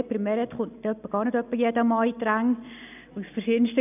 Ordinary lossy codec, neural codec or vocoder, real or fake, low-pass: none; none; real; 3.6 kHz